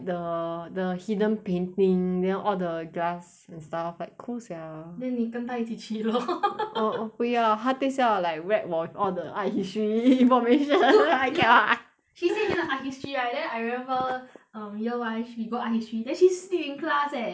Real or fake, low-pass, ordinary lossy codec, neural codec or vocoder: real; none; none; none